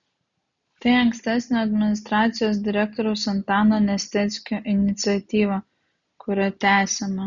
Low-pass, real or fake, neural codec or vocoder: 7.2 kHz; real; none